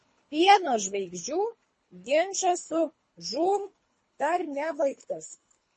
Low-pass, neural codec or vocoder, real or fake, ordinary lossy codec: 10.8 kHz; codec, 24 kHz, 3 kbps, HILCodec; fake; MP3, 32 kbps